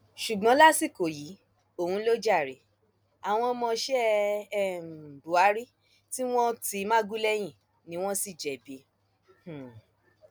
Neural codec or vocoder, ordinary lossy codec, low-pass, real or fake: none; none; none; real